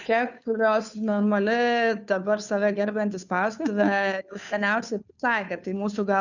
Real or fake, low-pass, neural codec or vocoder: fake; 7.2 kHz; codec, 16 kHz, 2 kbps, FunCodec, trained on Chinese and English, 25 frames a second